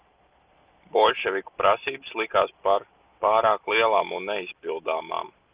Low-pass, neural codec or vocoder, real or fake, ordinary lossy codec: 3.6 kHz; none; real; Opus, 32 kbps